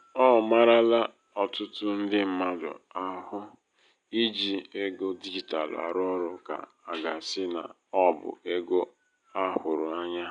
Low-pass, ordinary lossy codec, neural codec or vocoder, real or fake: 9.9 kHz; none; none; real